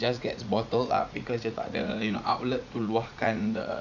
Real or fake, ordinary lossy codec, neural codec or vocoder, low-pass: fake; none; vocoder, 44.1 kHz, 80 mel bands, Vocos; 7.2 kHz